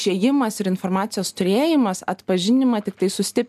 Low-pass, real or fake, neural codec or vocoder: 14.4 kHz; real; none